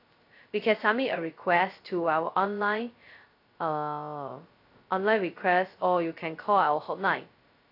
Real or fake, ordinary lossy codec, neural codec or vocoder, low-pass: fake; AAC, 32 kbps; codec, 16 kHz, 0.2 kbps, FocalCodec; 5.4 kHz